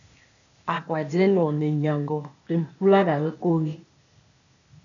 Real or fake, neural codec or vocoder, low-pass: fake; codec, 16 kHz, 0.8 kbps, ZipCodec; 7.2 kHz